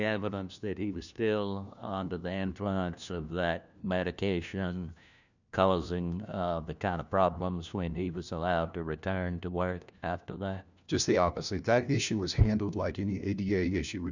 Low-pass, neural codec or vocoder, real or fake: 7.2 kHz; codec, 16 kHz, 1 kbps, FunCodec, trained on LibriTTS, 50 frames a second; fake